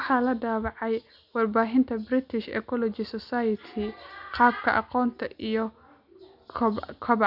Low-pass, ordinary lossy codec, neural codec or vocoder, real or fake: 5.4 kHz; none; none; real